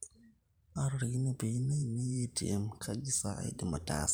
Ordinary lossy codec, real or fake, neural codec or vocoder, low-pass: none; fake; vocoder, 44.1 kHz, 128 mel bands every 512 samples, BigVGAN v2; none